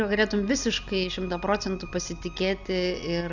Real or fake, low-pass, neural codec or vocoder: real; 7.2 kHz; none